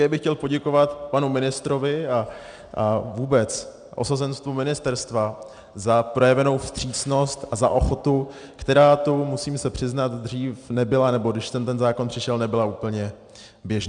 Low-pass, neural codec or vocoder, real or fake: 9.9 kHz; none; real